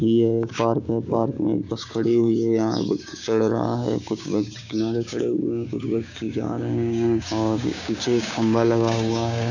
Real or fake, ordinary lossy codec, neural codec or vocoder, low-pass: fake; none; codec, 16 kHz, 6 kbps, DAC; 7.2 kHz